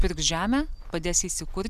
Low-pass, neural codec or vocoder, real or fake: 14.4 kHz; none; real